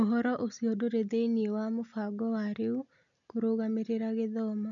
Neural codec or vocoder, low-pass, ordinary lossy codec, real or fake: none; 7.2 kHz; none; real